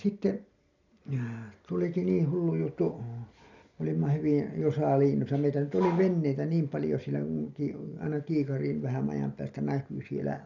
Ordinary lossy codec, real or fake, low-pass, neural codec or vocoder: none; real; 7.2 kHz; none